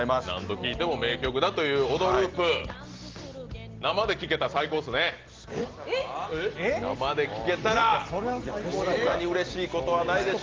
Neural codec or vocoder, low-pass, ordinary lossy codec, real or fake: none; 7.2 kHz; Opus, 16 kbps; real